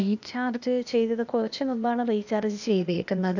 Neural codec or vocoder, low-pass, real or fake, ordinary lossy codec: codec, 16 kHz, 0.8 kbps, ZipCodec; 7.2 kHz; fake; none